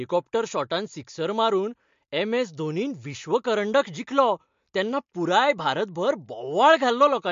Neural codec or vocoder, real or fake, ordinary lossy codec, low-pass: none; real; MP3, 48 kbps; 7.2 kHz